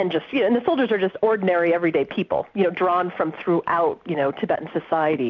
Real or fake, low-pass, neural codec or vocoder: real; 7.2 kHz; none